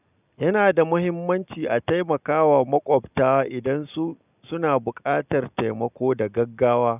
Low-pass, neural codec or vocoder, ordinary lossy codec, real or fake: 3.6 kHz; none; none; real